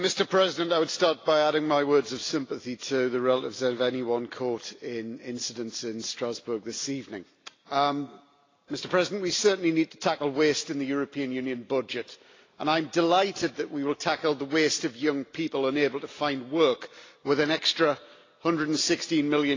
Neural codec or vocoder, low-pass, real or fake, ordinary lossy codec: none; 7.2 kHz; real; AAC, 32 kbps